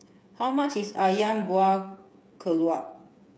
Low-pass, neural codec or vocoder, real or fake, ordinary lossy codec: none; codec, 16 kHz, 8 kbps, FreqCodec, smaller model; fake; none